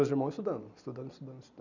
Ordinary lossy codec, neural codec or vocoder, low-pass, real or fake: none; none; 7.2 kHz; real